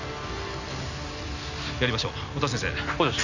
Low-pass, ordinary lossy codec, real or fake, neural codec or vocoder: 7.2 kHz; none; real; none